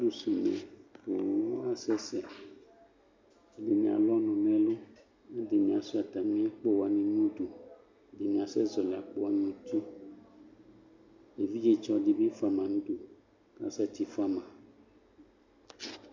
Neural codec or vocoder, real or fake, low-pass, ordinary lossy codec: none; real; 7.2 kHz; MP3, 64 kbps